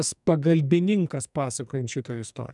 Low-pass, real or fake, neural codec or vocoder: 10.8 kHz; fake; codec, 44.1 kHz, 2.6 kbps, SNAC